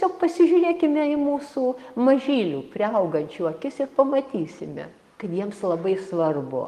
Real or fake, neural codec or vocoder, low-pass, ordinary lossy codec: real; none; 14.4 kHz; Opus, 24 kbps